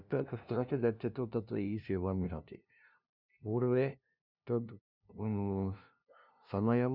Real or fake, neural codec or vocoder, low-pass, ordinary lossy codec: fake; codec, 16 kHz, 0.5 kbps, FunCodec, trained on LibriTTS, 25 frames a second; 5.4 kHz; none